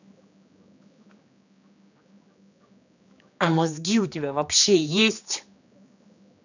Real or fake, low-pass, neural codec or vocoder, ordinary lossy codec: fake; 7.2 kHz; codec, 16 kHz, 2 kbps, X-Codec, HuBERT features, trained on general audio; none